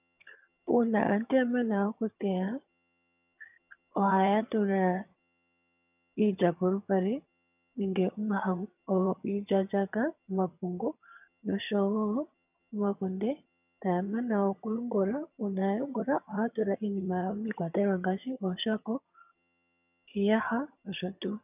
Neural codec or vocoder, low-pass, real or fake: vocoder, 22.05 kHz, 80 mel bands, HiFi-GAN; 3.6 kHz; fake